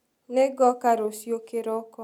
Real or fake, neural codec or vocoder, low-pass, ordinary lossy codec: real; none; 19.8 kHz; none